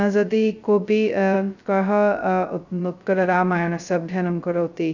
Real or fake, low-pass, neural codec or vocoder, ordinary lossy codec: fake; 7.2 kHz; codec, 16 kHz, 0.2 kbps, FocalCodec; none